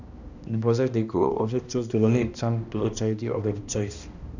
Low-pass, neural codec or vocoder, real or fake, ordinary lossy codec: 7.2 kHz; codec, 16 kHz, 1 kbps, X-Codec, HuBERT features, trained on balanced general audio; fake; none